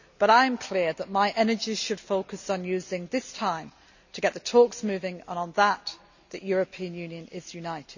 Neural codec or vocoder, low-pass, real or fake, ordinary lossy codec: none; 7.2 kHz; real; none